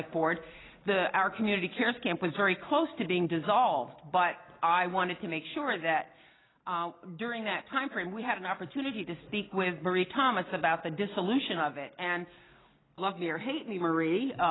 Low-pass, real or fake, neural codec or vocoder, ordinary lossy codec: 7.2 kHz; real; none; AAC, 16 kbps